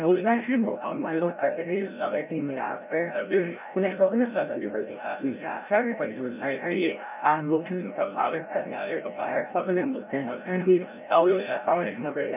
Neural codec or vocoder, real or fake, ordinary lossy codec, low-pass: codec, 16 kHz, 0.5 kbps, FreqCodec, larger model; fake; none; 3.6 kHz